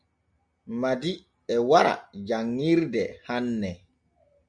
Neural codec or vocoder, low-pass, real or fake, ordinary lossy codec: none; 9.9 kHz; real; MP3, 96 kbps